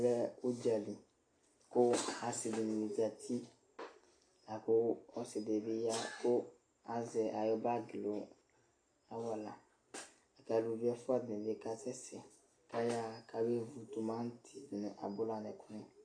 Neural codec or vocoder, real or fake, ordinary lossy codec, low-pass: none; real; AAC, 48 kbps; 9.9 kHz